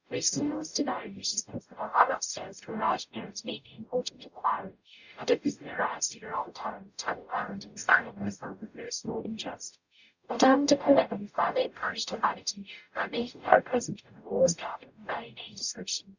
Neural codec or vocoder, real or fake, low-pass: codec, 44.1 kHz, 0.9 kbps, DAC; fake; 7.2 kHz